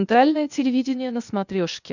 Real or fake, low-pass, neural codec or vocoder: fake; 7.2 kHz; codec, 16 kHz, 0.8 kbps, ZipCodec